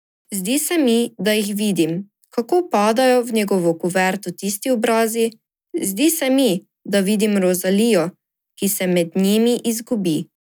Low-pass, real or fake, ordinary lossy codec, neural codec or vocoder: none; real; none; none